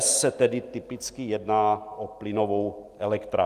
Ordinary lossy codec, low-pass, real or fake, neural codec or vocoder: Opus, 32 kbps; 14.4 kHz; real; none